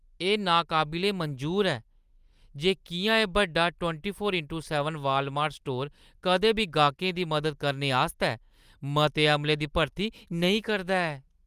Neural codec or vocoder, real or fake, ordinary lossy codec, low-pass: none; real; Opus, 32 kbps; 14.4 kHz